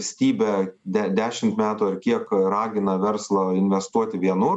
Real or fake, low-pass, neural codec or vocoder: real; 10.8 kHz; none